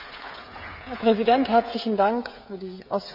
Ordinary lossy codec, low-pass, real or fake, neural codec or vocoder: none; 5.4 kHz; fake; codec, 16 kHz, 16 kbps, FreqCodec, smaller model